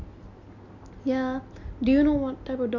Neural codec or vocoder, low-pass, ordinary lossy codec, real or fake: none; 7.2 kHz; none; real